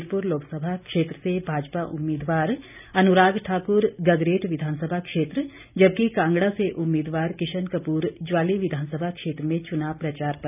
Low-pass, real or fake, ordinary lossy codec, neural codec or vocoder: 3.6 kHz; real; none; none